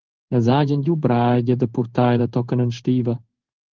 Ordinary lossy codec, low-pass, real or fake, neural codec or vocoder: Opus, 16 kbps; 7.2 kHz; fake; codec, 16 kHz in and 24 kHz out, 1 kbps, XY-Tokenizer